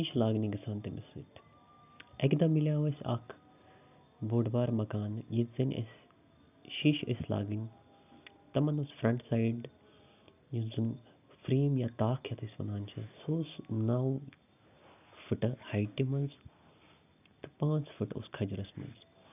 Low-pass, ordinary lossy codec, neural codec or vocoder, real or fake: 3.6 kHz; none; none; real